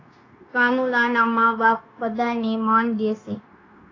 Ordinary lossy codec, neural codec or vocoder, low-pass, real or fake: AAC, 32 kbps; codec, 16 kHz, 0.9 kbps, LongCat-Audio-Codec; 7.2 kHz; fake